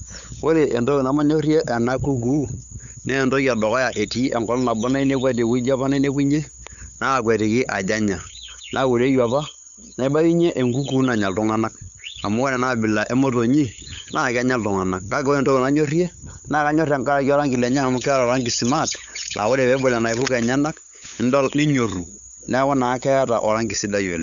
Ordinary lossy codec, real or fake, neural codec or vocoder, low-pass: none; fake; codec, 16 kHz, 8 kbps, FunCodec, trained on LibriTTS, 25 frames a second; 7.2 kHz